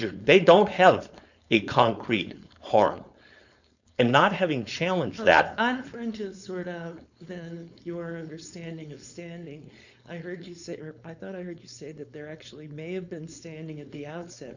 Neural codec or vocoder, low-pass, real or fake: codec, 16 kHz, 4.8 kbps, FACodec; 7.2 kHz; fake